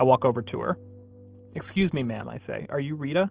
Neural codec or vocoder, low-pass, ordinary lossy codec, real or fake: none; 3.6 kHz; Opus, 16 kbps; real